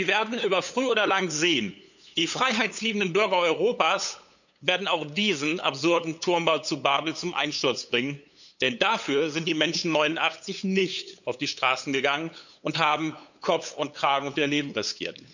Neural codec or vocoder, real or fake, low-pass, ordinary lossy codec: codec, 16 kHz, 8 kbps, FunCodec, trained on LibriTTS, 25 frames a second; fake; 7.2 kHz; none